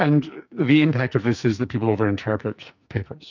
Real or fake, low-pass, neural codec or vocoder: fake; 7.2 kHz; codec, 44.1 kHz, 2.6 kbps, SNAC